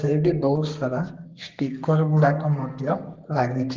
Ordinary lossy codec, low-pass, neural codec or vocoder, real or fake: Opus, 16 kbps; 7.2 kHz; codec, 32 kHz, 1.9 kbps, SNAC; fake